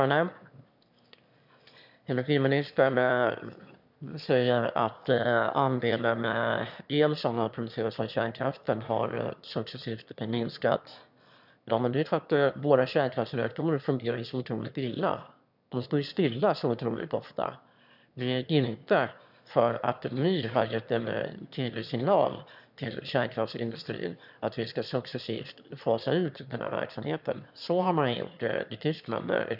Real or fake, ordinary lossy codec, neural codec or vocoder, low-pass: fake; none; autoencoder, 22.05 kHz, a latent of 192 numbers a frame, VITS, trained on one speaker; 5.4 kHz